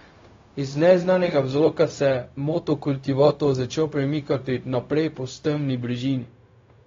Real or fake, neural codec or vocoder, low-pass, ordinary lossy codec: fake; codec, 16 kHz, 0.4 kbps, LongCat-Audio-Codec; 7.2 kHz; AAC, 32 kbps